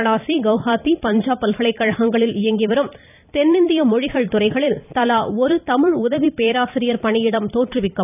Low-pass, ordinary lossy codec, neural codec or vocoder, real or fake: 3.6 kHz; none; vocoder, 44.1 kHz, 128 mel bands every 256 samples, BigVGAN v2; fake